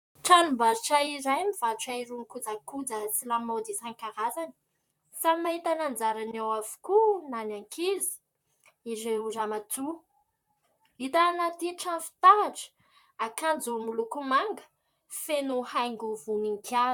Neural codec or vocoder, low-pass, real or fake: vocoder, 44.1 kHz, 128 mel bands, Pupu-Vocoder; 19.8 kHz; fake